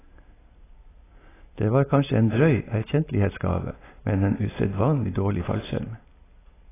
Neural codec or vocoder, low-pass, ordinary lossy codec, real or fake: none; 3.6 kHz; AAC, 16 kbps; real